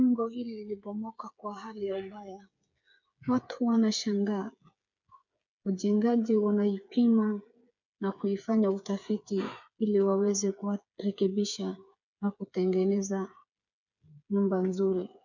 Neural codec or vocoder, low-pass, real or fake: codec, 16 kHz, 8 kbps, FreqCodec, smaller model; 7.2 kHz; fake